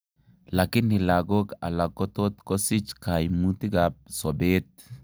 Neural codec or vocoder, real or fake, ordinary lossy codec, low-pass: none; real; none; none